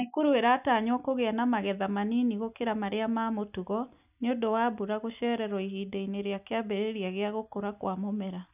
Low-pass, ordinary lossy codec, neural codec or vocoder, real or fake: 3.6 kHz; none; none; real